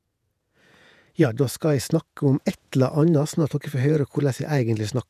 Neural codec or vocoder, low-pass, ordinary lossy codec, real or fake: vocoder, 48 kHz, 128 mel bands, Vocos; 14.4 kHz; none; fake